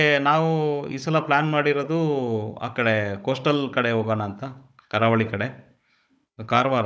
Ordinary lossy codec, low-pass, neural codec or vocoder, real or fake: none; none; codec, 16 kHz, 16 kbps, FunCodec, trained on Chinese and English, 50 frames a second; fake